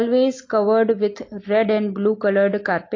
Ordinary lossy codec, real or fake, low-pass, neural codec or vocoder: AAC, 32 kbps; real; 7.2 kHz; none